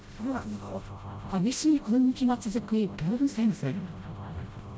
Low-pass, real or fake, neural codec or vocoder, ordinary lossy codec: none; fake; codec, 16 kHz, 0.5 kbps, FreqCodec, smaller model; none